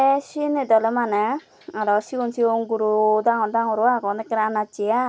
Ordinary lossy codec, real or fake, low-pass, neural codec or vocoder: none; real; none; none